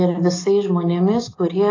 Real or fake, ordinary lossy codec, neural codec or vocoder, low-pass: real; AAC, 32 kbps; none; 7.2 kHz